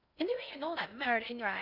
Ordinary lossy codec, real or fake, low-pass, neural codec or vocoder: none; fake; 5.4 kHz; codec, 16 kHz in and 24 kHz out, 0.6 kbps, FocalCodec, streaming, 4096 codes